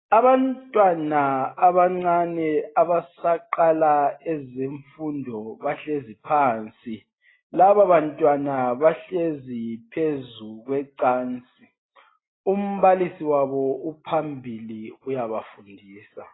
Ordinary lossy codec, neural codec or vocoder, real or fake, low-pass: AAC, 16 kbps; none; real; 7.2 kHz